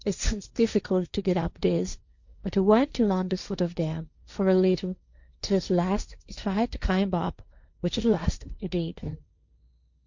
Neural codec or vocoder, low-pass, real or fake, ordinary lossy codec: codec, 16 kHz, 1.1 kbps, Voila-Tokenizer; 7.2 kHz; fake; Opus, 64 kbps